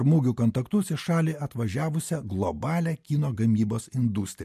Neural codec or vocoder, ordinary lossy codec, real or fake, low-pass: none; MP3, 64 kbps; real; 14.4 kHz